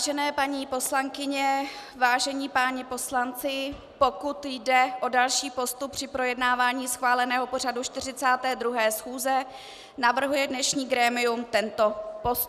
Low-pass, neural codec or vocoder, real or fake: 14.4 kHz; none; real